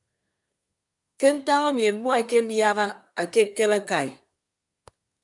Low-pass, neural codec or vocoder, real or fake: 10.8 kHz; codec, 24 kHz, 1 kbps, SNAC; fake